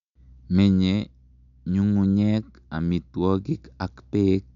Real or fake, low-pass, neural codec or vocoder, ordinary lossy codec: real; 7.2 kHz; none; none